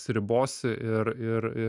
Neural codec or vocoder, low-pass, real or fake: none; 10.8 kHz; real